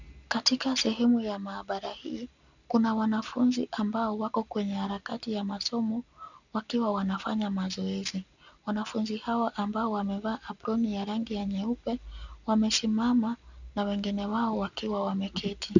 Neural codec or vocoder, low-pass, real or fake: none; 7.2 kHz; real